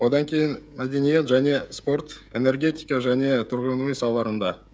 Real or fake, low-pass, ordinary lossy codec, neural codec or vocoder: fake; none; none; codec, 16 kHz, 16 kbps, FreqCodec, smaller model